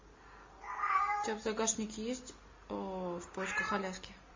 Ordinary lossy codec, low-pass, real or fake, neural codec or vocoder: MP3, 32 kbps; 7.2 kHz; real; none